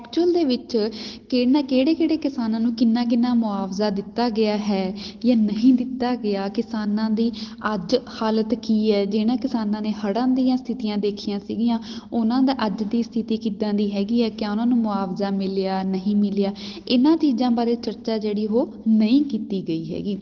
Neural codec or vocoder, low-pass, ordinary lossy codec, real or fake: none; 7.2 kHz; Opus, 16 kbps; real